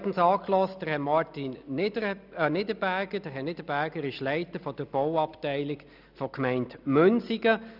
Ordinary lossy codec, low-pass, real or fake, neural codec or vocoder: none; 5.4 kHz; real; none